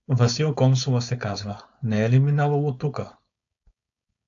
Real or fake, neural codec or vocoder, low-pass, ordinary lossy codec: fake; codec, 16 kHz, 4.8 kbps, FACodec; 7.2 kHz; AAC, 64 kbps